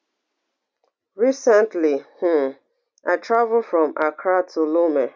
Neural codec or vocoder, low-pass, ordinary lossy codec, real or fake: none; none; none; real